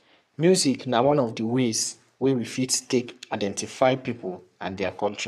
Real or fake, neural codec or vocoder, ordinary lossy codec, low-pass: fake; codec, 44.1 kHz, 3.4 kbps, Pupu-Codec; none; 14.4 kHz